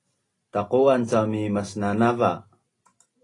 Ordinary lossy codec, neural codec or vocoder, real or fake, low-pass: AAC, 32 kbps; none; real; 10.8 kHz